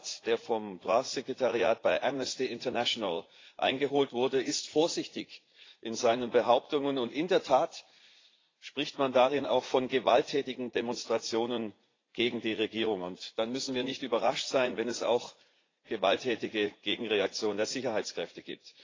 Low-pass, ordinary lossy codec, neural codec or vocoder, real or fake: 7.2 kHz; AAC, 32 kbps; vocoder, 44.1 kHz, 80 mel bands, Vocos; fake